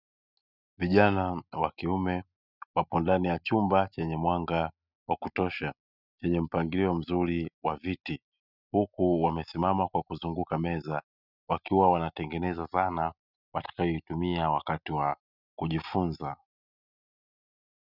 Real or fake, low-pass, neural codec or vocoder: real; 5.4 kHz; none